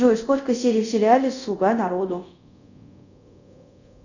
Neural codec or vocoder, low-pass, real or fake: codec, 24 kHz, 0.5 kbps, DualCodec; 7.2 kHz; fake